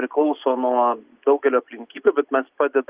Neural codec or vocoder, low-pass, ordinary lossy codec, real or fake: none; 3.6 kHz; Opus, 24 kbps; real